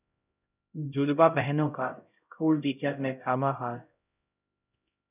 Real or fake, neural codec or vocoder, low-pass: fake; codec, 16 kHz, 0.5 kbps, X-Codec, HuBERT features, trained on LibriSpeech; 3.6 kHz